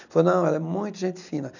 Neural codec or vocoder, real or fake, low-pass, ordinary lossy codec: none; real; 7.2 kHz; none